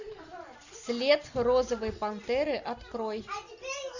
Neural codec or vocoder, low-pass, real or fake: none; 7.2 kHz; real